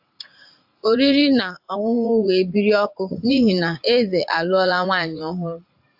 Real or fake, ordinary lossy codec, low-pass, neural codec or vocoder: fake; AAC, 48 kbps; 5.4 kHz; vocoder, 44.1 kHz, 80 mel bands, Vocos